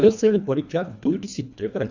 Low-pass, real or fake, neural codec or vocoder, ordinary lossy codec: 7.2 kHz; fake; codec, 24 kHz, 1.5 kbps, HILCodec; none